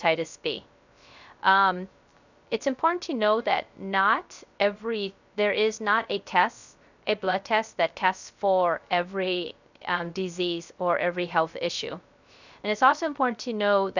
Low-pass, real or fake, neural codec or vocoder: 7.2 kHz; fake; codec, 16 kHz, 0.3 kbps, FocalCodec